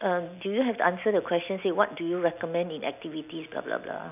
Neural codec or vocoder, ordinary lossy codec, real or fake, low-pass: none; none; real; 3.6 kHz